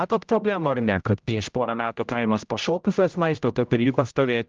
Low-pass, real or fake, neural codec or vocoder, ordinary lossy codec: 7.2 kHz; fake; codec, 16 kHz, 0.5 kbps, X-Codec, HuBERT features, trained on general audio; Opus, 24 kbps